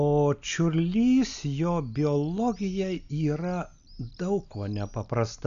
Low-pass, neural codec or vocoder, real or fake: 7.2 kHz; none; real